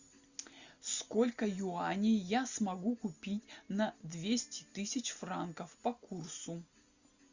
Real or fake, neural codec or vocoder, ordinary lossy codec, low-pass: real; none; Opus, 64 kbps; 7.2 kHz